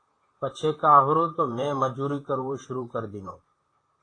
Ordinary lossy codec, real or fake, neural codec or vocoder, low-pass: AAC, 32 kbps; fake; vocoder, 44.1 kHz, 128 mel bands, Pupu-Vocoder; 9.9 kHz